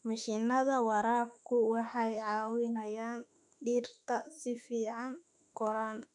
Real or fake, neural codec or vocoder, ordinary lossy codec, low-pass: fake; autoencoder, 48 kHz, 32 numbers a frame, DAC-VAE, trained on Japanese speech; none; 10.8 kHz